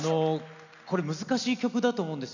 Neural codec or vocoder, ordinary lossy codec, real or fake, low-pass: none; none; real; 7.2 kHz